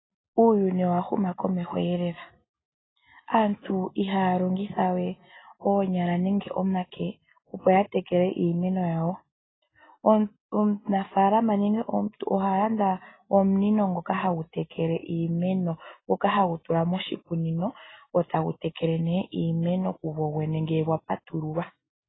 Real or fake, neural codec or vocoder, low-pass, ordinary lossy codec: real; none; 7.2 kHz; AAC, 16 kbps